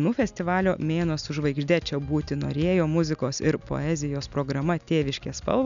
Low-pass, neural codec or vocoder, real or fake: 7.2 kHz; none; real